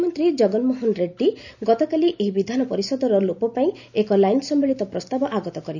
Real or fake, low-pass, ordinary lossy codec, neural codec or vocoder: real; 7.2 kHz; none; none